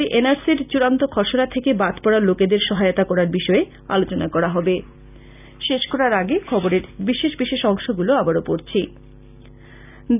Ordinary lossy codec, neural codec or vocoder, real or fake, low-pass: none; none; real; 3.6 kHz